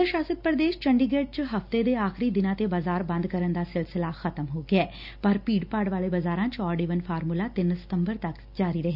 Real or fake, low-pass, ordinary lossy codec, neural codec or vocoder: real; 5.4 kHz; none; none